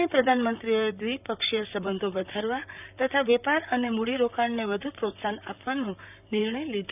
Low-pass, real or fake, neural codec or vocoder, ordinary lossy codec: 3.6 kHz; fake; vocoder, 44.1 kHz, 128 mel bands, Pupu-Vocoder; none